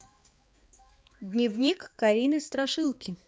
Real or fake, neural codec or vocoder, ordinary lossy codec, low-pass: fake; codec, 16 kHz, 2 kbps, X-Codec, HuBERT features, trained on balanced general audio; none; none